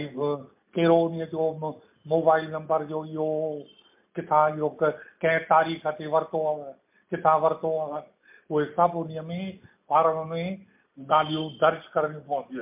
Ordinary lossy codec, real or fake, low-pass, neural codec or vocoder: MP3, 32 kbps; real; 3.6 kHz; none